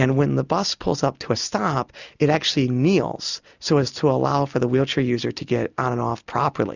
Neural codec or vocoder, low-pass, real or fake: none; 7.2 kHz; real